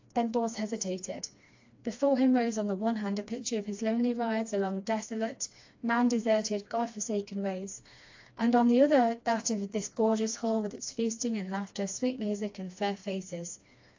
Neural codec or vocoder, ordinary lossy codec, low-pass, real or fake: codec, 16 kHz, 2 kbps, FreqCodec, smaller model; AAC, 48 kbps; 7.2 kHz; fake